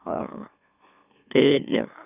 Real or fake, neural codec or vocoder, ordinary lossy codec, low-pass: fake; autoencoder, 44.1 kHz, a latent of 192 numbers a frame, MeloTTS; none; 3.6 kHz